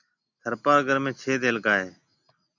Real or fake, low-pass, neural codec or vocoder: real; 7.2 kHz; none